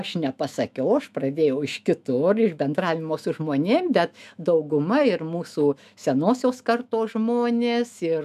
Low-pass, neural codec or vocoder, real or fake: 14.4 kHz; autoencoder, 48 kHz, 128 numbers a frame, DAC-VAE, trained on Japanese speech; fake